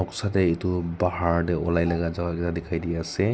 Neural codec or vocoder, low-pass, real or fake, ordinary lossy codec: none; none; real; none